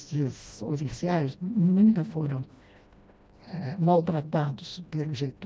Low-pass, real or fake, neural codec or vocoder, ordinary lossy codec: none; fake; codec, 16 kHz, 1 kbps, FreqCodec, smaller model; none